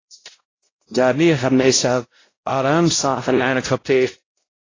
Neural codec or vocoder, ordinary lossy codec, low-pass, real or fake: codec, 16 kHz, 0.5 kbps, X-Codec, WavLM features, trained on Multilingual LibriSpeech; AAC, 32 kbps; 7.2 kHz; fake